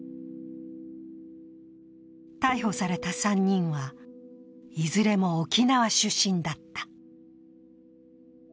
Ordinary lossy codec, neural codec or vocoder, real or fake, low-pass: none; none; real; none